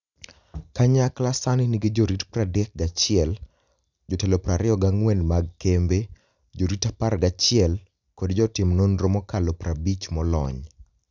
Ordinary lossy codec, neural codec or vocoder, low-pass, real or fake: none; none; 7.2 kHz; real